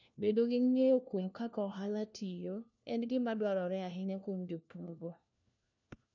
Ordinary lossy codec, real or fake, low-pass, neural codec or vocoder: none; fake; 7.2 kHz; codec, 16 kHz, 1 kbps, FunCodec, trained on LibriTTS, 50 frames a second